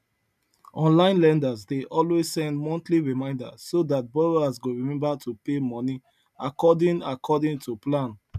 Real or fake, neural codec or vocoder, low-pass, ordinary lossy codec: real; none; 14.4 kHz; none